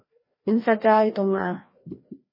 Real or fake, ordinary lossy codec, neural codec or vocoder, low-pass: fake; MP3, 24 kbps; codec, 16 kHz, 1 kbps, FreqCodec, larger model; 5.4 kHz